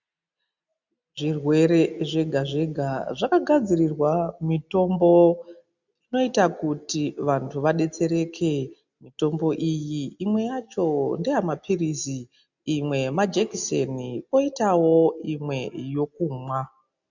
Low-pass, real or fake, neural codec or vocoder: 7.2 kHz; real; none